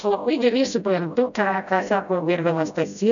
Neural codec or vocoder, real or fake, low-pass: codec, 16 kHz, 0.5 kbps, FreqCodec, smaller model; fake; 7.2 kHz